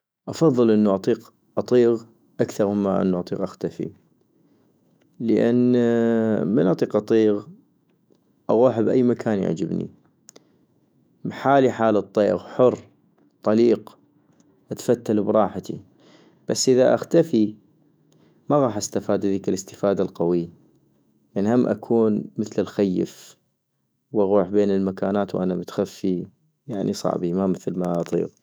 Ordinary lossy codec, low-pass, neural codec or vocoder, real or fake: none; none; autoencoder, 48 kHz, 128 numbers a frame, DAC-VAE, trained on Japanese speech; fake